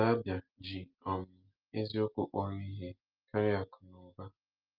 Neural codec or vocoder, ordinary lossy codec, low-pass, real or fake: none; Opus, 24 kbps; 5.4 kHz; real